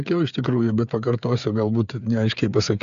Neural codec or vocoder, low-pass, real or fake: codec, 16 kHz, 8 kbps, FreqCodec, smaller model; 7.2 kHz; fake